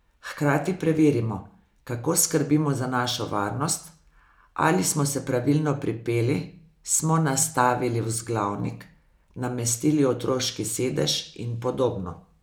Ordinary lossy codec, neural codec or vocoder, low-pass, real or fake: none; none; none; real